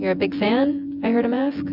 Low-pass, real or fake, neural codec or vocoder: 5.4 kHz; fake; vocoder, 24 kHz, 100 mel bands, Vocos